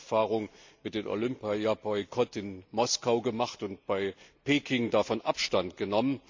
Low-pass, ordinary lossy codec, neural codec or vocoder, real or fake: 7.2 kHz; MP3, 64 kbps; none; real